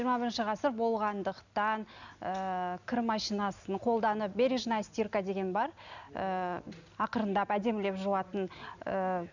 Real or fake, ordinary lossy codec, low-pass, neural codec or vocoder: real; none; 7.2 kHz; none